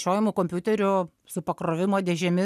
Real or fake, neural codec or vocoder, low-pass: real; none; 14.4 kHz